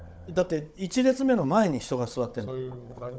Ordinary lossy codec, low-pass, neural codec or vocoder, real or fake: none; none; codec, 16 kHz, 16 kbps, FunCodec, trained on Chinese and English, 50 frames a second; fake